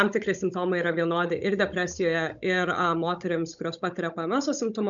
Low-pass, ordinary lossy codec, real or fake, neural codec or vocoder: 7.2 kHz; MP3, 96 kbps; fake; codec, 16 kHz, 16 kbps, FunCodec, trained on Chinese and English, 50 frames a second